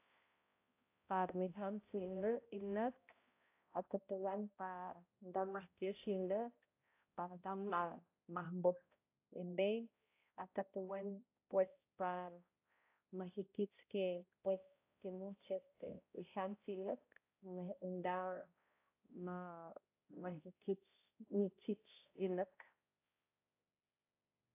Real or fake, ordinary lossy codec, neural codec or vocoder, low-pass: fake; AAC, 32 kbps; codec, 16 kHz, 0.5 kbps, X-Codec, HuBERT features, trained on balanced general audio; 3.6 kHz